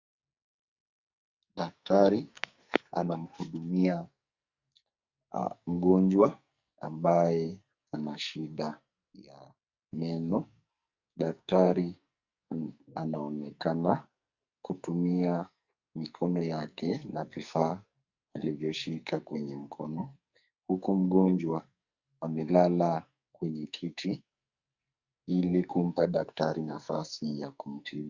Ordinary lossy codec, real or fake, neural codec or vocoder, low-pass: Opus, 64 kbps; fake; codec, 44.1 kHz, 2.6 kbps, SNAC; 7.2 kHz